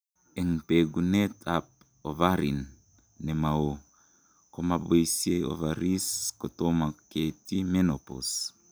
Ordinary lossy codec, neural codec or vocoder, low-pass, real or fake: none; none; none; real